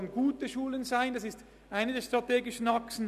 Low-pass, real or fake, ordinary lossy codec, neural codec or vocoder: 14.4 kHz; real; none; none